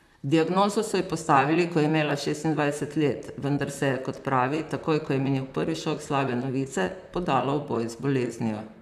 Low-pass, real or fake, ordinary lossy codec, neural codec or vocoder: 14.4 kHz; fake; none; vocoder, 44.1 kHz, 128 mel bands, Pupu-Vocoder